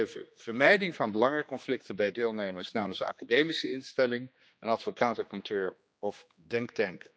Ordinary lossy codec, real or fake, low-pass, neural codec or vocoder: none; fake; none; codec, 16 kHz, 2 kbps, X-Codec, HuBERT features, trained on general audio